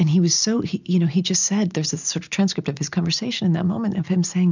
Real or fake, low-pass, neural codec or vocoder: real; 7.2 kHz; none